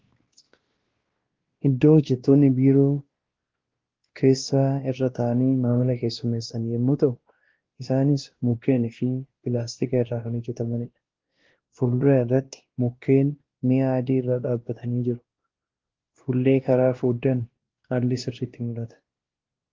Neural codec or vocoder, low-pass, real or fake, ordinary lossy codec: codec, 16 kHz, 1 kbps, X-Codec, WavLM features, trained on Multilingual LibriSpeech; 7.2 kHz; fake; Opus, 16 kbps